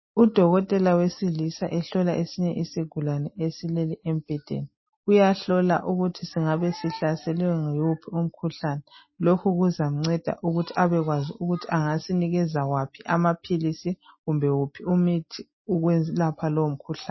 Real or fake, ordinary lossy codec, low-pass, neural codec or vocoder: real; MP3, 24 kbps; 7.2 kHz; none